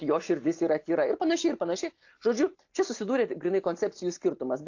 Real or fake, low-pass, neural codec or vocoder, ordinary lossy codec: real; 7.2 kHz; none; AAC, 48 kbps